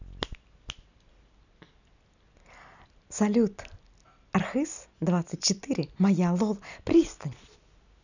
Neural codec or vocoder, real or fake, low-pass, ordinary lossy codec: none; real; 7.2 kHz; none